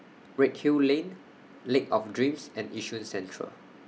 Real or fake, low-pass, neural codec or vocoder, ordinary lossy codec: real; none; none; none